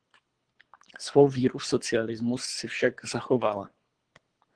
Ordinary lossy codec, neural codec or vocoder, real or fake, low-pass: Opus, 16 kbps; codec, 24 kHz, 3 kbps, HILCodec; fake; 9.9 kHz